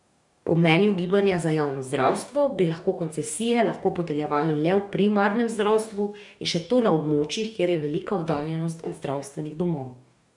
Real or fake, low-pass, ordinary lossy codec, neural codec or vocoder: fake; 10.8 kHz; none; codec, 44.1 kHz, 2.6 kbps, DAC